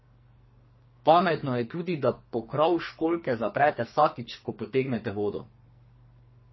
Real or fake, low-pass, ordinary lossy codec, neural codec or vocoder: fake; 7.2 kHz; MP3, 24 kbps; codec, 44.1 kHz, 2.6 kbps, SNAC